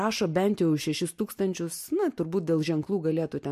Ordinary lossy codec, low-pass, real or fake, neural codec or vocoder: MP3, 64 kbps; 14.4 kHz; real; none